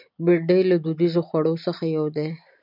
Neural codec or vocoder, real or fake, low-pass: none; real; 5.4 kHz